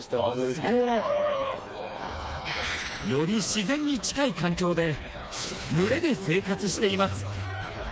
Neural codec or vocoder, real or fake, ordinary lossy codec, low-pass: codec, 16 kHz, 2 kbps, FreqCodec, smaller model; fake; none; none